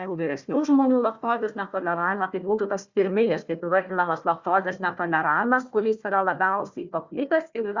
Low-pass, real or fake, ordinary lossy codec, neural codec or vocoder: 7.2 kHz; fake; Opus, 64 kbps; codec, 16 kHz, 1 kbps, FunCodec, trained on Chinese and English, 50 frames a second